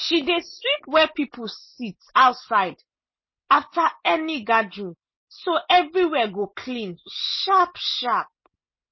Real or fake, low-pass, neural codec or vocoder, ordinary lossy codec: real; 7.2 kHz; none; MP3, 24 kbps